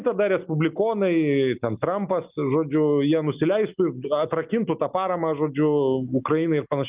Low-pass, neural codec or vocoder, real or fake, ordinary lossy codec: 3.6 kHz; none; real; Opus, 24 kbps